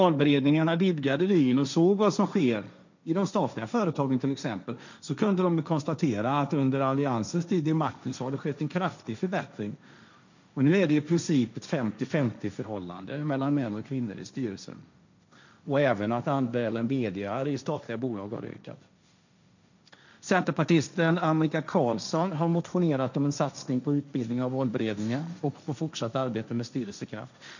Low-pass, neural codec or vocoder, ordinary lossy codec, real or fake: 7.2 kHz; codec, 16 kHz, 1.1 kbps, Voila-Tokenizer; none; fake